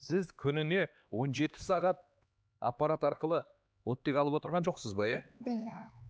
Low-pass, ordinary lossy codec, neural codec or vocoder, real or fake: none; none; codec, 16 kHz, 2 kbps, X-Codec, HuBERT features, trained on LibriSpeech; fake